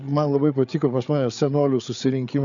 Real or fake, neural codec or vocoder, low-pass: fake; codec, 16 kHz, 8 kbps, FreqCodec, larger model; 7.2 kHz